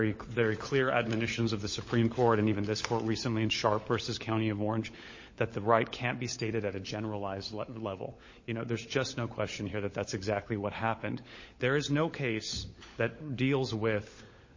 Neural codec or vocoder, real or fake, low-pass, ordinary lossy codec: codec, 16 kHz, 16 kbps, FunCodec, trained on LibriTTS, 50 frames a second; fake; 7.2 kHz; MP3, 32 kbps